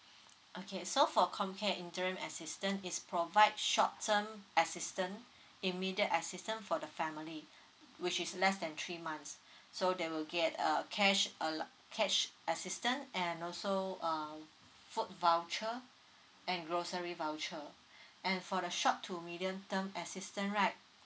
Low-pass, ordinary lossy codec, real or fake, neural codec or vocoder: none; none; real; none